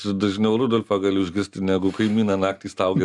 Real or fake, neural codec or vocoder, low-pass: real; none; 10.8 kHz